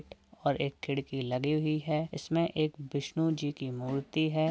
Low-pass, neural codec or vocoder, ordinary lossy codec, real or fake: none; none; none; real